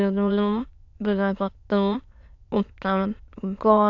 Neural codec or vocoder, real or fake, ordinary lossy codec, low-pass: autoencoder, 22.05 kHz, a latent of 192 numbers a frame, VITS, trained on many speakers; fake; AAC, 48 kbps; 7.2 kHz